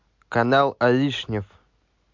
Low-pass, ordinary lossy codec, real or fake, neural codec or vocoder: 7.2 kHz; MP3, 48 kbps; real; none